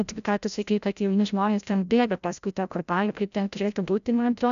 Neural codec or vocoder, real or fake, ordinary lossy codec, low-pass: codec, 16 kHz, 0.5 kbps, FreqCodec, larger model; fake; MP3, 96 kbps; 7.2 kHz